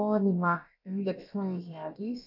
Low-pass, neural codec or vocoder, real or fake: 5.4 kHz; codec, 16 kHz, about 1 kbps, DyCAST, with the encoder's durations; fake